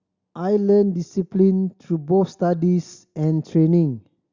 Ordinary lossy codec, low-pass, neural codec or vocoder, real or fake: Opus, 64 kbps; 7.2 kHz; none; real